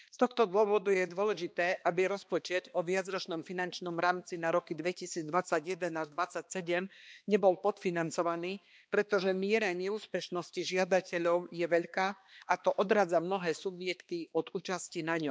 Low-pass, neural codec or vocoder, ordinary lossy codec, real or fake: none; codec, 16 kHz, 2 kbps, X-Codec, HuBERT features, trained on balanced general audio; none; fake